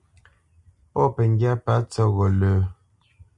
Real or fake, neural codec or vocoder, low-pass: real; none; 10.8 kHz